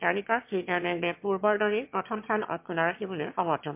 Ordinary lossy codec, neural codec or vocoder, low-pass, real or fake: MP3, 32 kbps; autoencoder, 22.05 kHz, a latent of 192 numbers a frame, VITS, trained on one speaker; 3.6 kHz; fake